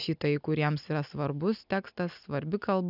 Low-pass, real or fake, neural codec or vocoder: 5.4 kHz; real; none